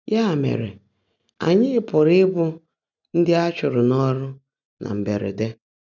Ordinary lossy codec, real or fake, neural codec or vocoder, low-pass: none; real; none; 7.2 kHz